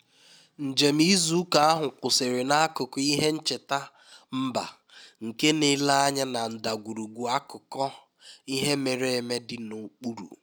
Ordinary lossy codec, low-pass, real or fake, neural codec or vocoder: none; none; real; none